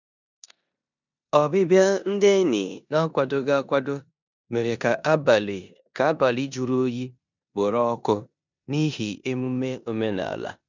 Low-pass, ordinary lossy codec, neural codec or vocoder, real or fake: 7.2 kHz; none; codec, 16 kHz in and 24 kHz out, 0.9 kbps, LongCat-Audio-Codec, four codebook decoder; fake